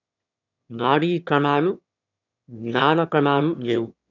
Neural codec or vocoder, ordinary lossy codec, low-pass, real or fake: autoencoder, 22.05 kHz, a latent of 192 numbers a frame, VITS, trained on one speaker; none; 7.2 kHz; fake